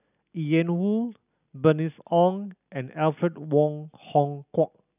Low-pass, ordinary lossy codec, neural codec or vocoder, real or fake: 3.6 kHz; none; none; real